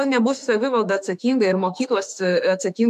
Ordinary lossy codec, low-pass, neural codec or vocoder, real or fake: MP3, 96 kbps; 14.4 kHz; autoencoder, 48 kHz, 32 numbers a frame, DAC-VAE, trained on Japanese speech; fake